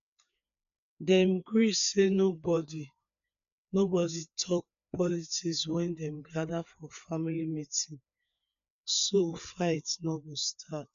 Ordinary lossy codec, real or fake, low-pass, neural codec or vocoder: none; fake; 7.2 kHz; codec, 16 kHz, 4 kbps, FreqCodec, larger model